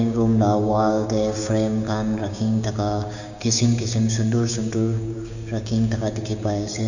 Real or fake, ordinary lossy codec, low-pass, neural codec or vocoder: fake; none; 7.2 kHz; codec, 16 kHz, 6 kbps, DAC